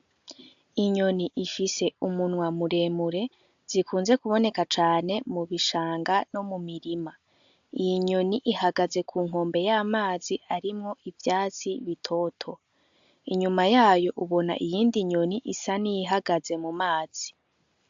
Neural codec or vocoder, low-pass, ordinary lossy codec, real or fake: none; 7.2 kHz; AAC, 64 kbps; real